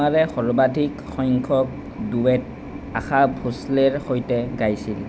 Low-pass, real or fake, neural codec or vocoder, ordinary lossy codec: none; real; none; none